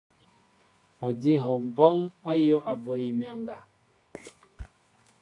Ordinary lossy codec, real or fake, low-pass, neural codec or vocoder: AAC, 48 kbps; fake; 10.8 kHz; codec, 24 kHz, 0.9 kbps, WavTokenizer, medium music audio release